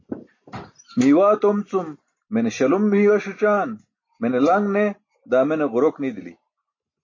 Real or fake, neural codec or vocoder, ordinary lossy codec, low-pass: real; none; MP3, 32 kbps; 7.2 kHz